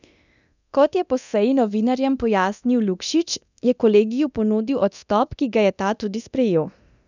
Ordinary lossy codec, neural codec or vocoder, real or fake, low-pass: none; codec, 24 kHz, 0.9 kbps, DualCodec; fake; 7.2 kHz